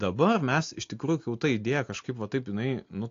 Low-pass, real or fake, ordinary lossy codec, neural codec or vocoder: 7.2 kHz; real; AAC, 48 kbps; none